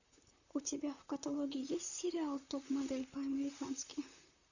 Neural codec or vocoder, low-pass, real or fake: vocoder, 44.1 kHz, 128 mel bands, Pupu-Vocoder; 7.2 kHz; fake